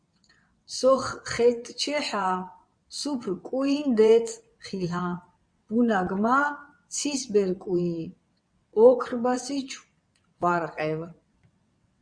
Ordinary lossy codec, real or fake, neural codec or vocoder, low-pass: Opus, 64 kbps; fake; vocoder, 22.05 kHz, 80 mel bands, WaveNeXt; 9.9 kHz